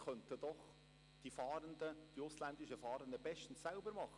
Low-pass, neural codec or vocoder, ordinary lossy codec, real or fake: 10.8 kHz; none; none; real